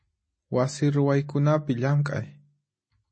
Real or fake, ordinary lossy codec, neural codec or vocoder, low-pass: real; MP3, 32 kbps; none; 9.9 kHz